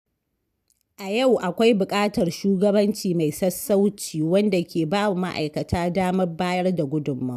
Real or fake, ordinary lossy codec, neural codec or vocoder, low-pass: real; none; none; 14.4 kHz